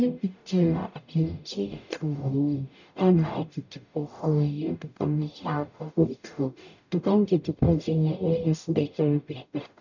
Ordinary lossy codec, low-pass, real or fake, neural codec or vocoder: none; 7.2 kHz; fake; codec, 44.1 kHz, 0.9 kbps, DAC